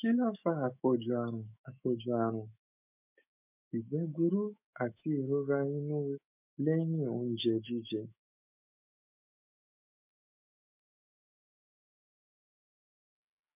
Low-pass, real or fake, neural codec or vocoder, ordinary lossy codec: 3.6 kHz; fake; autoencoder, 48 kHz, 128 numbers a frame, DAC-VAE, trained on Japanese speech; MP3, 32 kbps